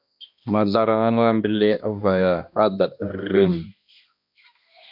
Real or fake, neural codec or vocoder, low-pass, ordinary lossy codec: fake; codec, 16 kHz, 1 kbps, X-Codec, HuBERT features, trained on balanced general audio; 5.4 kHz; MP3, 48 kbps